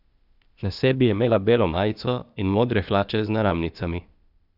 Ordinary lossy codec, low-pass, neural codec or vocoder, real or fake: none; 5.4 kHz; codec, 16 kHz, 0.8 kbps, ZipCodec; fake